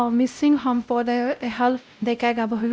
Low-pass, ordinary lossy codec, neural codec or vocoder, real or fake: none; none; codec, 16 kHz, 0.5 kbps, X-Codec, WavLM features, trained on Multilingual LibriSpeech; fake